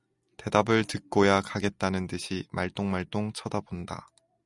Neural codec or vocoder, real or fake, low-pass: none; real; 10.8 kHz